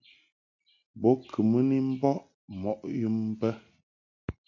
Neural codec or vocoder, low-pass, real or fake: none; 7.2 kHz; real